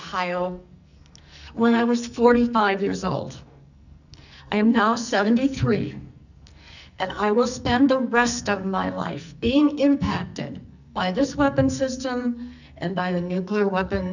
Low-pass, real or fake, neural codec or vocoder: 7.2 kHz; fake; codec, 44.1 kHz, 2.6 kbps, SNAC